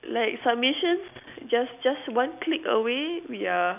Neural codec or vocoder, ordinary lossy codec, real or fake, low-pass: none; none; real; 3.6 kHz